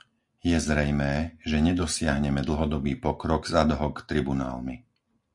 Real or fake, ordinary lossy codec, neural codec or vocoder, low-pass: real; MP3, 64 kbps; none; 10.8 kHz